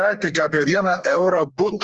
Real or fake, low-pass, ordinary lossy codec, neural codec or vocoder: fake; 10.8 kHz; Opus, 32 kbps; codec, 32 kHz, 1.9 kbps, SNAC